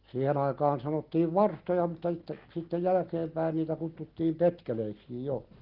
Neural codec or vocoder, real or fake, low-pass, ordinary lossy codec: none; real; 5.4 kHz; Opus, 16 kbps